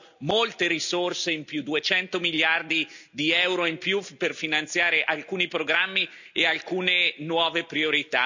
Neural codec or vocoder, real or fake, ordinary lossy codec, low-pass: none; real; none; 7.2 kHz